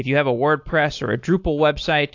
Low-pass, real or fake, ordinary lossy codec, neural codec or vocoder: 7.2 kHz; real; AAC, 48 kbps; none